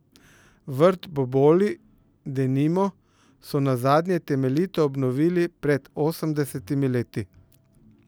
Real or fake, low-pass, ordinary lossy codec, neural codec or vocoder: real; none; none; none